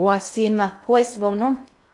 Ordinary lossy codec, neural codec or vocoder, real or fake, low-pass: AAC, 64 kbps; codec, 16 kHz in and 24 kHz out, 0.6 kbps, FocalCodec, streaming, 2048 codes; fake; 10.8 kHz